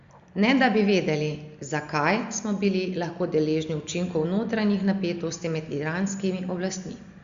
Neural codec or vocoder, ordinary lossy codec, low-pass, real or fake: none; Opus, 64 kbps; 7.2 kHz; real